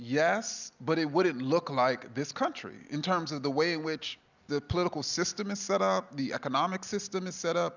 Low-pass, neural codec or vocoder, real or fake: 7.2 kHz; none; real